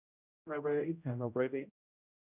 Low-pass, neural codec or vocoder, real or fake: 3.6 kHz; codec, 16 kHz, 0.5 kbps, X-Codec, HuBERT features, trained on general audio; fake